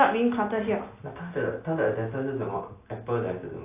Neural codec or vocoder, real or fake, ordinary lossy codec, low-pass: none; real; AAC, 24 kbps; 3.6 kHz